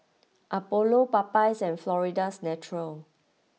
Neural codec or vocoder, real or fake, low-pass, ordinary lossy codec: none; real; none; none